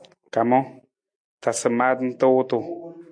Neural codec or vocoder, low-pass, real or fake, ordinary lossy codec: none; 9.9 kHz; real; MP3, 64 kbps